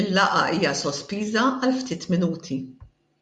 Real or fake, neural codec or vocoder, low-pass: real; none; 10.8 kHz